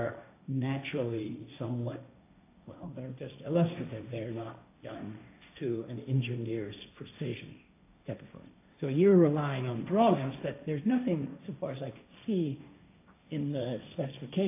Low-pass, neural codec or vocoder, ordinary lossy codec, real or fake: 3.6 kHz; codec, 16 kHz, 1.1 kbps, Voila-Tokenizer; AAC, 24 kbps; fake